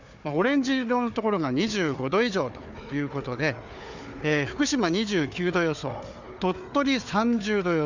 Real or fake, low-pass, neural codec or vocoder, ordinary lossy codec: fake; 7.2 kHz; codec, 16 kHz, 4 kbps, FunCodec, trained on Chinese and English, 50 frames a second; none